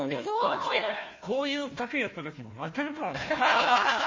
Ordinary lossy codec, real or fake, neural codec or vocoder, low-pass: MP3, 32 kbps; fake; codec, 16 kHz, 1 kbps, FunCodec, trained on Chinese and English, 50 frames a second; 7.2 kHz